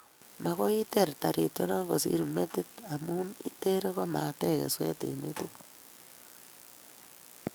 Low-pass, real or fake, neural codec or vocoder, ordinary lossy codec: none; fake; codec, 44.1 kHz, 7.8 kbps, DAC; none